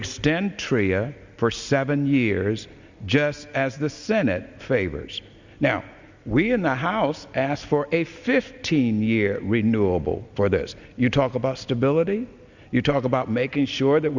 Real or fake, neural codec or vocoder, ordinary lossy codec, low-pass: real; none; Opus, 64 kbps; 7.2 kHz